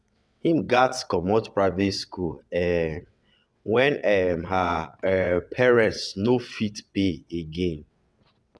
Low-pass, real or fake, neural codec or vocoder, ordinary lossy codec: none; fake; vocoder, 22.05 kHz, 80 mel bands, WaveNeXt; none